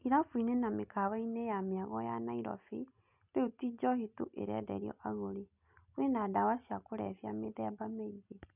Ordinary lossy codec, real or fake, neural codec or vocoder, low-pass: none; real; none; 3.6 kHz